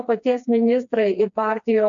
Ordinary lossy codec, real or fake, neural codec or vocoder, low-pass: MP3, 64 kbps; fake; codec, 16 kHz, 2 kbps, FreqCodec, smaller model; 7.2 kHz